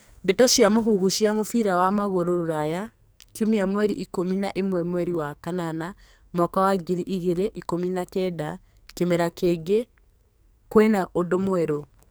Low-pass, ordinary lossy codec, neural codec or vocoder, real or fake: none; none; codec, 44.1 kHz, 2.6 kbps, SNAC; fake